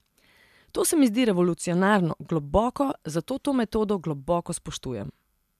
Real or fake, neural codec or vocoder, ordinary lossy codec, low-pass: real; none; MP3, 96 kbps; 14.4 kHz